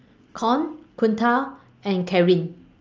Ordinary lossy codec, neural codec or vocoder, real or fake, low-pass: Opus, 24 kbps; none; real; 7.2 kHz